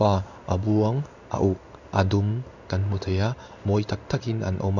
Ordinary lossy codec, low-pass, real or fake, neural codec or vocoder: none; 7.2 kHz; fake; codec, 16 kHz in and 24 kHz out, 1 kbps, XY-Tokenizer